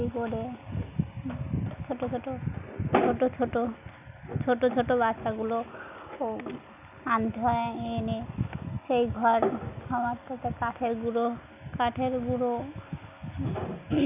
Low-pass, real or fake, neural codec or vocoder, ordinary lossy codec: 3.6 kHz; real; none; none